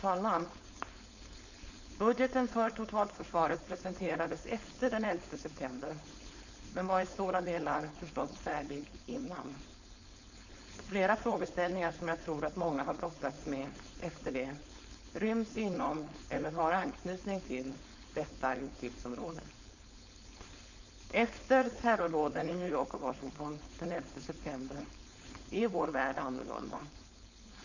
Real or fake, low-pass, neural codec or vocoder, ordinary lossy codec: fake; 7.2 kHz; codec, 16 kHz, 4.8 kbps, FACodec; none